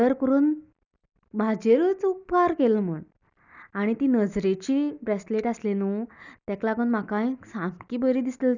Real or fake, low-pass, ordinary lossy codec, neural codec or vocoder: real; 7.2 kHz; none; none